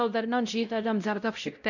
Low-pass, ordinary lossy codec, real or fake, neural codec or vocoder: 7.2 kHz; none; fake; codec, 16 kHz, 0.5 kbps, X-Codec, WavLM features, trained on Multilingual LibriSpeech